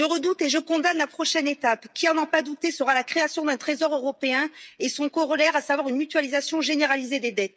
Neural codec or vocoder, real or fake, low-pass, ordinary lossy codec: codec, 16 kHz, 8 kbps, FreqCodec, smaller model; fake; none; none